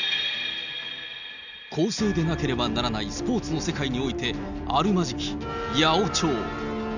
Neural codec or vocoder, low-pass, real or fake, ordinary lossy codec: none; 7.2 kHz; real; none